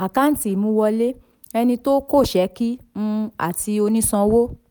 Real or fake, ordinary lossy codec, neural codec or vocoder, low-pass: real; none; none; none